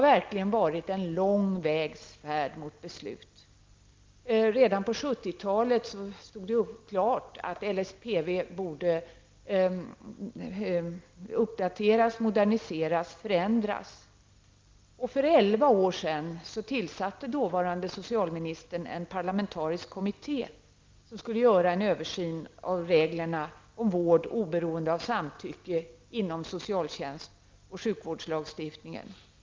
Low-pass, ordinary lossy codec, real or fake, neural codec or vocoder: 7.2 kHz; Opus, 32 kbps; real; none